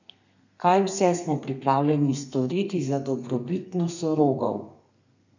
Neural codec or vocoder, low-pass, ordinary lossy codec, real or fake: codec, 32 kHz, 1.9 kbps, SNAC; 7.2 kHz; none; fake